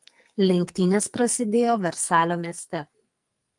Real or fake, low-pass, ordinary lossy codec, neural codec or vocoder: fake; 10.8 kHz; Opus, 32 kbps; codec, 44.1 kHz, 2.6 kbps, SNAC